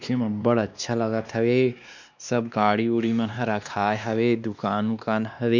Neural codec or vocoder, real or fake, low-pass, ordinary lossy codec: codec, 16 kHz, 2 kbps, X-Codec, WavLM features, trained on Multilingual LibriSpeech; fake; 7.2 kHz; none